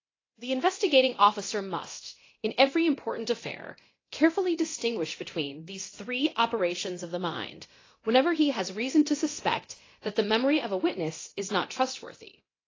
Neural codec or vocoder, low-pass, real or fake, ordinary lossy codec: codec, 24 kHz, 0.9 kbps, DualCodec; 7.2 kHz; fake; AAC, 32 kbps